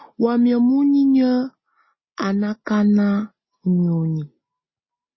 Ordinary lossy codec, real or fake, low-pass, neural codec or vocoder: MP3, 24 kbps; real; 7.2 kHz; none